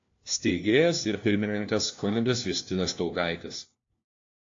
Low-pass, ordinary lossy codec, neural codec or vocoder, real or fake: 7.2 kHz; AAC, 48 kbps; codec, 16 kHz, 1 kbps, FunCodec, trained on LibriTTS, 50 frames a second; fake